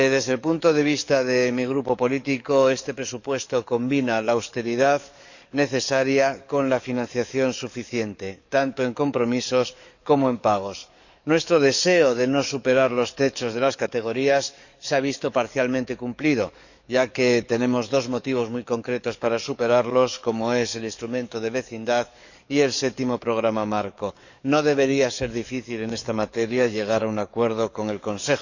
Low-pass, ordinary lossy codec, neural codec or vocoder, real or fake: 7.2 kHz; none; codec, 44.1 kHz, 7.8 kbps, DAC; fake